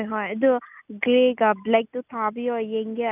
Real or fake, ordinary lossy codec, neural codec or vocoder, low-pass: real; none; none; 3.6 kHz